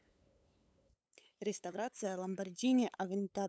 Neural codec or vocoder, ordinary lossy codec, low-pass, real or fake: codec, 16 kHz, 8 kbps, FunCodec, trained on LibriTTS, 25 frames a second; none; none; fake